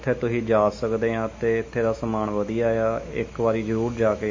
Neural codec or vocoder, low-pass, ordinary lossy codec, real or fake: autoencoder, 48 kHz, 128 numbers a frame, DAC-VAE, trained on Japanese speech; 7.2 kHz; MP3, 32 kbps; fake